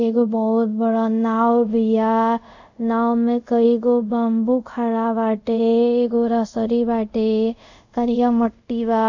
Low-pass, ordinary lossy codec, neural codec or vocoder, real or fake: 7.2 kHz; none; codec, 24 kHz, 0.5 kbps, DualCodec; fake